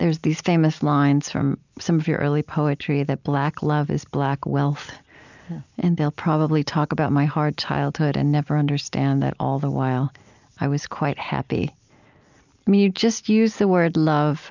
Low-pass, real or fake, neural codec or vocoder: 7.2 kHz; real; none